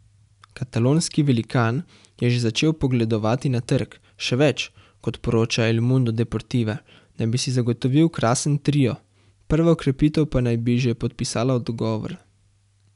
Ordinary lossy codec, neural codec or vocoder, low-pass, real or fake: none; none; 10.8 kHz; real